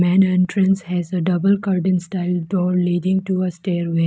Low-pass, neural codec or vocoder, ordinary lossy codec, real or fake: none; none; none; real